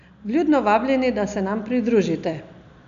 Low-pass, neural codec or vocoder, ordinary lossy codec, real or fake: 7.2 kHz; none; none; real